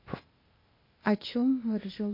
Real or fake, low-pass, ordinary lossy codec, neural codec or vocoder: fake; 5.4 kHz; MP3, 24 kbps; codec, 16 kHz, 0.8 kbps, ZipCodec